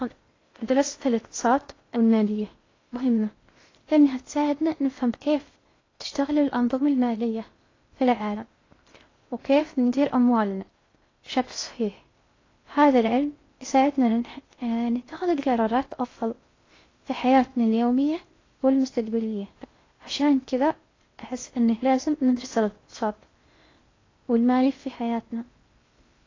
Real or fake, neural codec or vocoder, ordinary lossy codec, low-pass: fake; codec, 16 kHz in and 24 kHz out, 0.6 kbps, FocalCodec, streaming, 2048 codes; AAC, 32 kbps; 7.2 kHz